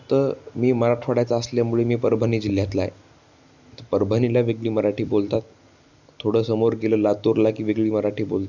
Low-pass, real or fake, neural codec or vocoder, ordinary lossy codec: 7.2 kHz; real; none; none